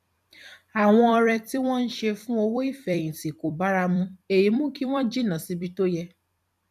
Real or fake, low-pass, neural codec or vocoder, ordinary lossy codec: fake; 14.4 kHz; vocoder, 44.1 kHz, 128 mel bands every 256 samples, BigVGAN v2; none